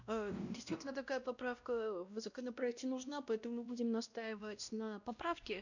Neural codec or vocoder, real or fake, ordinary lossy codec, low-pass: codec, 16 kHz, 1 kbps, X-Codec, WavLM features, trained on Multilingual LibriSpeech; fake; none; 7.2 kHz